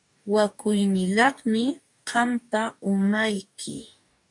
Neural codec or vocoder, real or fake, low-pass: codec, 44.1 kHz, 2.6 kbps, DAC; fake; 10.8 kHz